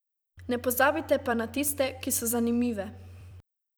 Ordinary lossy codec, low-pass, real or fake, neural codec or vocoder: none; none; fake; vocoder, 44.1 kHz, 128 mel bands every 256 samples, BigVGAN v2